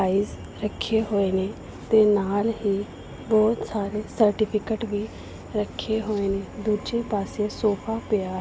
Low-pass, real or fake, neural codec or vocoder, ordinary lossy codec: none; real; none; none